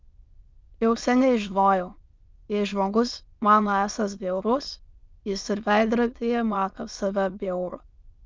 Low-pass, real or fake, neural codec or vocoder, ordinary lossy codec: 7.2 kHz; fake; autoencoder, 22.05 kHz, a latent of 192 numbers a frame, VITS, trained on many speakers; Opus, 32 kbps